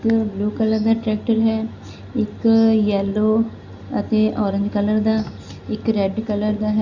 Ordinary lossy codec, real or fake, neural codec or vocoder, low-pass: Opus, 64 kbps; real; none; 7.2 kHz